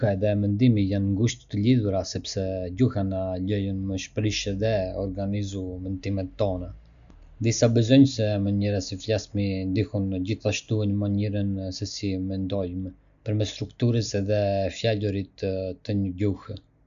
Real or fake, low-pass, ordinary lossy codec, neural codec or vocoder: real; 7.2 kHz; none; none